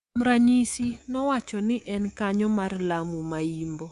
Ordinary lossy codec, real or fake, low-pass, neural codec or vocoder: Opus, 64 kbps; fake; 10.8 kHz; codec, 24 kHz, 3.1 kbps, DualCodec